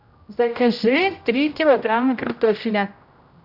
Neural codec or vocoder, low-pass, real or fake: codec, 16 kHz, 0.5 kbps, X-Codec, HuBERT features, trained on general audio; 5.4 kHz; fake